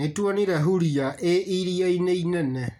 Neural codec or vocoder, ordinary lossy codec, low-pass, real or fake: none; none; 19.8 kHz; real